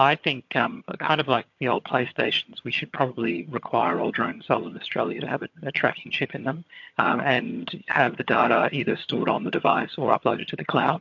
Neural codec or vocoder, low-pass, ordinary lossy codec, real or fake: vocoder, 22.05 kHz, 80 mel bands, HiFi-GAN; 7.2 kHz; MP3, 48 kbps; fake